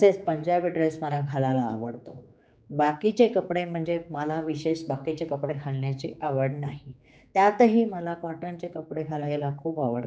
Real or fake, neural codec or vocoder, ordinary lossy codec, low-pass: fake; codec, 16 kHz, 4 kbps, X-Codec, HuBERT features, trained on general audio; none; none